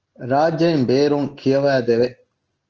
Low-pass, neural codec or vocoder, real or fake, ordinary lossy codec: 7.2 kHz; none; real; Opus, 16 kbps